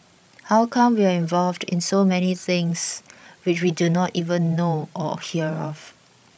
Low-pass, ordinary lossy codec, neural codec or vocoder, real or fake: none; none; codec, 16 kHz, 16 kbps, FreqCodec, larger model; fake